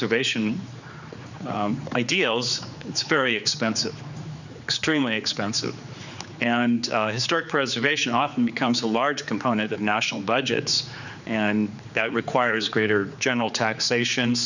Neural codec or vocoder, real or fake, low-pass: codec, 16 kHz, 4 kbps, X-Codec, HuBERT features, trained on general audio; fake; 7.2 kHz